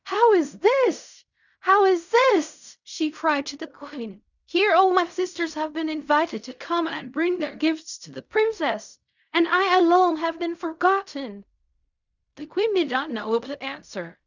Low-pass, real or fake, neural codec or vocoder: 7.2 kHz; fake; codec, 16 kHz in and 24 kHz out, 0.4 kbps, LongCat-Audio-Codec, fine tuned four codebook decoder